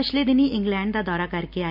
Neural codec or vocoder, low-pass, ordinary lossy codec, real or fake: none; 5.4 kHz; none; real